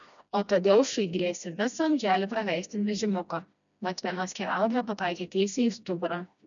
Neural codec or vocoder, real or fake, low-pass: codec, 16 kHz, 1 kbps, FreqCodec, smaller model; fake; 7.2 kHz